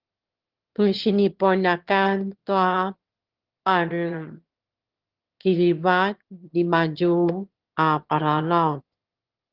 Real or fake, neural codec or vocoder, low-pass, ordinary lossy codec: fake; autoencoder, 22.05 kHz, a latent of 192 numbers a frame, VITS, trained on one speaker; 5.4 kHz; Opus, 16 kbps